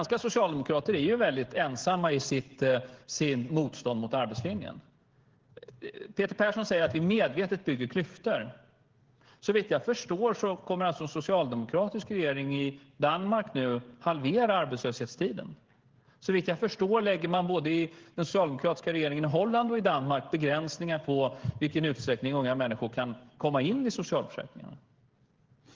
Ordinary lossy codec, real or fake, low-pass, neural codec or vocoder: Opus, 16 kbps; fake; 7.2 kHz; codec, 16 kHz, 16 kbps, FreqCodec, smaller model